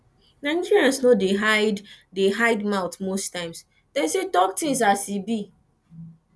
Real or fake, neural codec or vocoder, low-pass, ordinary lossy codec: real; none; none; none